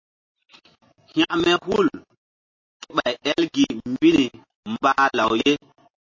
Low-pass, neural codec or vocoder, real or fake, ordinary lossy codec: 7.2 kHz; none; real; MP3, 32 kbps